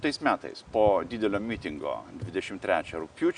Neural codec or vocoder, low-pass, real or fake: none; 9.9 kHz; real